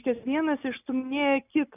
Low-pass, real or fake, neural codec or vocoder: 3.6 kHz; real; none